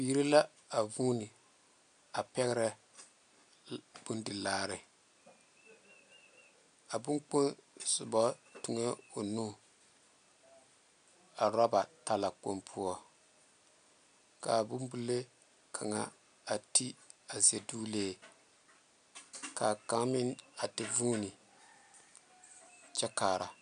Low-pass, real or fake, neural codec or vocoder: 9.9 kHz; real; none